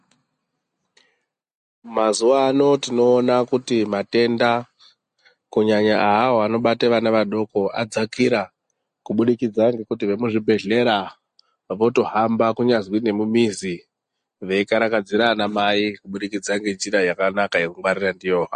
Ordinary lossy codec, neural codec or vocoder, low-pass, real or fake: MP3, 48 kbps; none; 14.4 kHz; real